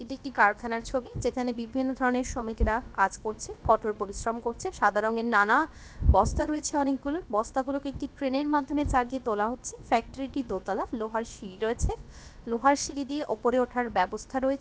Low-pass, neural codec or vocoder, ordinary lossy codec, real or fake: none; codec, 16 kHz, about 1 kbps, DyCAST, with the encoder's durations; none; fake